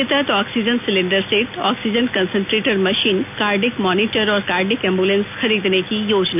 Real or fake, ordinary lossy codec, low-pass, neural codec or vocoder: real; none; 3.6 kHz; none